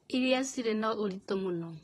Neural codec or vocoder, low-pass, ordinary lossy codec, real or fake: vocoder, 44.1 kHz, 128 mel bands, Pupu-Vocoder; 19.8 kHz; AAC, 32 kbps; fake